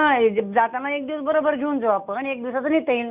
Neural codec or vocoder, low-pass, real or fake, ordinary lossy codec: none; 3.6 kHz; real; none